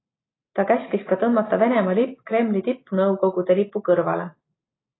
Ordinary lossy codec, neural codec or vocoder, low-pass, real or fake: AAC, 16 kbps; none; 7.2 kHz; real